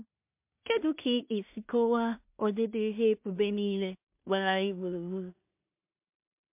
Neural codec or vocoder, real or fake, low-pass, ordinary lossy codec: codec, 16 kHz in and 24 kHz out, 0.4 kbps, LongCat-Audio-Codec, two codebook decoder; fake; 3.6 kHz; MP3, 32 kbps